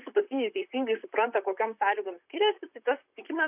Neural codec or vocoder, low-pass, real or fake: vocoder, 44.1 kHz, 128 mel bands, Pupu-Vocoder; 3.6 kHz; fake